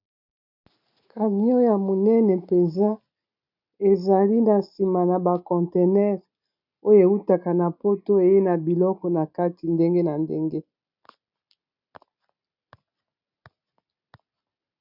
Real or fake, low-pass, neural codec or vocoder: real; 5.4 kHz; none